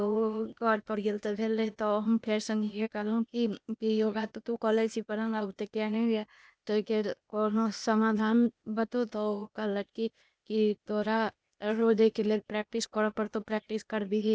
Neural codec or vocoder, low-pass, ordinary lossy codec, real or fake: codec, 16 kHz, 0.8 kbps, ZipCodec; none; none; fake